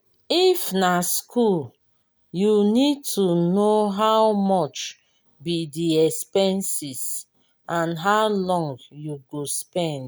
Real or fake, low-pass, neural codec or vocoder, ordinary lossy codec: real; none; none; none